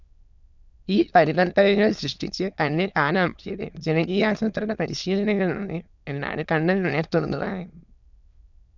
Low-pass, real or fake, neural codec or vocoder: 7.2 kHz; fake; autoencoder, 22.05 kHz, a latent of 192 numbers a frame, VITS, trained on many speakers